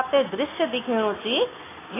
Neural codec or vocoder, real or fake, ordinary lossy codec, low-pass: none; real; AAC, 16 kbps; 3.6 kHz